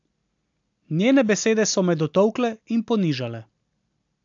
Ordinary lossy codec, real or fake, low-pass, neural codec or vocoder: AAC, 64 kbps; real; 7.2 kHz; none